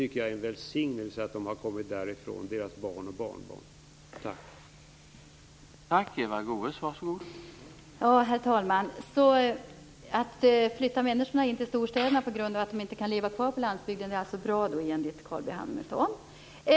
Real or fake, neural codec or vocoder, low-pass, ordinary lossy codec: real; none; none; none